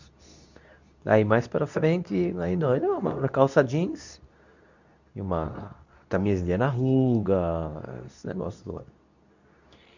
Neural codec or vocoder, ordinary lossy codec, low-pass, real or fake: codec, 24 kHz, 0.9 kbps, WavTokenizer, medium speech release version 2; none; 7.2 kHz; fake